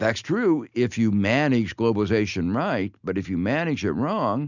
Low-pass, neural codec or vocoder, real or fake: 7.2 kHz; none; real